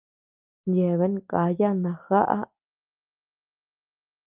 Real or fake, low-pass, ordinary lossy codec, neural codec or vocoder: real; 3.6 kHz; Opus, 24 kbps; none